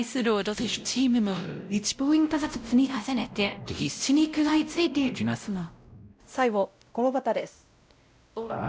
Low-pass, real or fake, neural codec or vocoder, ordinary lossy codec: none; fake; codec, 16 kHz, 0.5 kbps, X-Codec, WavLM features, trained on Multilingual LibriSpeech; none